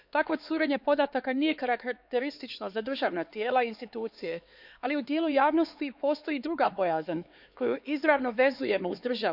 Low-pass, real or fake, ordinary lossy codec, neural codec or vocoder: 5.4 kHz; fake; none; codec, 16 kHz, 4 kbps, X-Codec, HuBERT features, trained on LibriSpeech